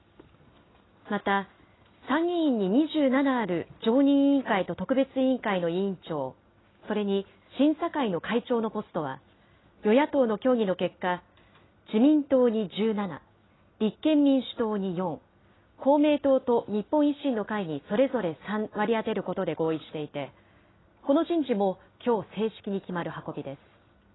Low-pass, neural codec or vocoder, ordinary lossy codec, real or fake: 7.2 kHz; none; AAC, 16 kbps; real